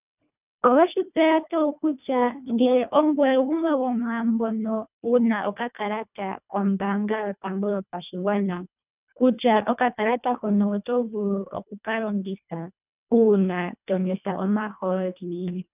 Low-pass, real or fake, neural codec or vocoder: 3.6 kHz; fake; codec, 24 kHz, 1.5 kbps, HILCodec